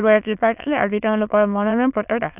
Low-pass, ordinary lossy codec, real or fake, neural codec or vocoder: 3.6 kHz; none; fake; autoencoder, 22.05 kHz, a latent of 192 numbers a frame, VITS, trained on many speakers